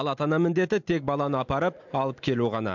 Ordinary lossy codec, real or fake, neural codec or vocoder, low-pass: MP3, 64 kbps; real; none; 7.2 kHz